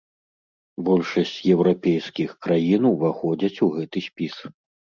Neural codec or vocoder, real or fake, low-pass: none; real; 7.2 kHz